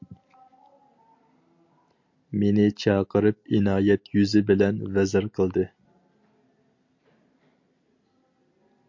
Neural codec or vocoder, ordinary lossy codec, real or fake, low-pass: none; MP3, 48 kbps; real; 7.2 kHz